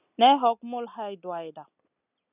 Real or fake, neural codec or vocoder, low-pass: real; none; 3.6 kHz